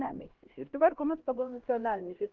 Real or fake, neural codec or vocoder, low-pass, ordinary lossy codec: fake; codec, 16 kHz, 1 kbps, X-Codec, HuBERT features, trained on LibriSpeech; 7.2 kHz; Opus, 16 kbps